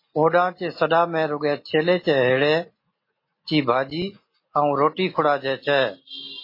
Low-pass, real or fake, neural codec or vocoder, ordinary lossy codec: 5.4 kHz; real; none; MP3, 24 kbps